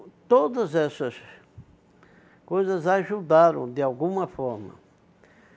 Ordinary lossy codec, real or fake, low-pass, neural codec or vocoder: none; real; none; none